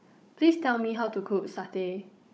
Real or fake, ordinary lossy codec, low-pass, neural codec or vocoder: fake; none; none; codec, 16 kHz, 16 kbps, FunCodec, trained on Chinese and English, 50 frames a second